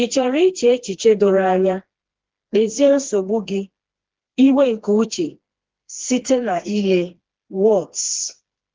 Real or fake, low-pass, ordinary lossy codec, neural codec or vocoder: fake; 7.2 kHz; Opus, 16 kbps; codec, 16 kHz, 2 kbps, FreqCodec, smaller model